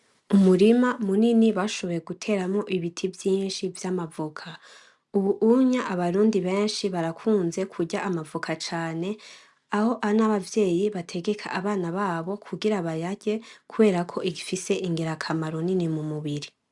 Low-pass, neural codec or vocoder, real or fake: 10.8 kHz; none; real